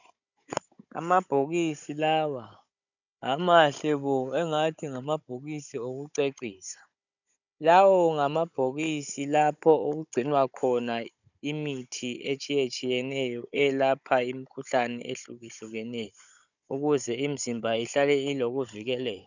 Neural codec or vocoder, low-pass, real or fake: codec, 16 kHz, 16 kbps, FunCodec, trained on Chinese and English, 50 frames a second; 7.2 kHz; fake